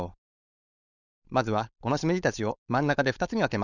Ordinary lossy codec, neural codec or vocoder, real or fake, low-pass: none; codec, 16 kHz, 4.8 kbps, FACodec; fake; 7.2 kHz